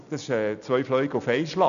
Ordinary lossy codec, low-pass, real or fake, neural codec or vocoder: none; 7.2 kHz; real; none